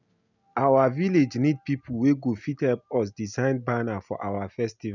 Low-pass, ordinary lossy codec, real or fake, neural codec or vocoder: 7.2 kHz; none; real; none